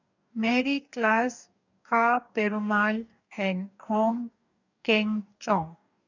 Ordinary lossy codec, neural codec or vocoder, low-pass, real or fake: none; codec, 44.1 kHz, 2.6 kbps, DAC; 7.2 kHz; fake